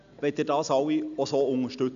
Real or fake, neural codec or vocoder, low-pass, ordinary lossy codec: real; none; 7.2 kHz; none